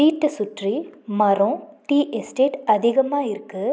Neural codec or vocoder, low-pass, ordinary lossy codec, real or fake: none; none; none; real